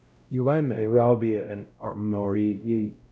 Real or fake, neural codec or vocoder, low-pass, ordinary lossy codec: fake; codec, 16 kHz, 0.5 kbps, X-Codec, WavLM features, trained on Multilingual LibriSpeech; none; none